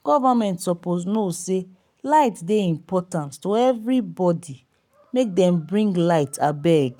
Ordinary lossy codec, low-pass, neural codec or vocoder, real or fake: none; 19.8 kHz; codec, 44.1 kHz, 7.8 kbps, Pupu-Codec; fake